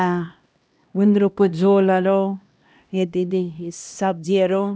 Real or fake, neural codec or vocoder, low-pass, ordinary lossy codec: fake; codec, 16 kHz, 1 kbps, X-Codec, HuBERT features, trained on LibriSpeech; none; none